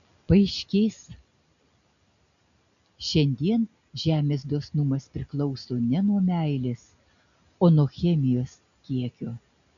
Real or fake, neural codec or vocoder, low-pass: real; none; 7.2 kHz